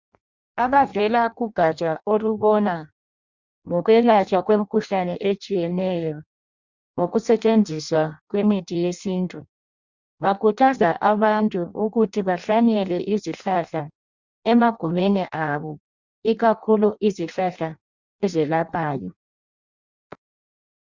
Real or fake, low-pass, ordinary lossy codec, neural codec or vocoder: fake; 7.2 kHz; Opus, 64 kbps; codec, 16 kHz in and 24 kHz out, 0.6 kbps, FireRedTTS-2 codec